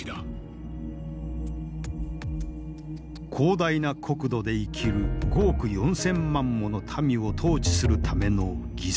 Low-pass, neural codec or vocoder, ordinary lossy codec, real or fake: none; none; none; real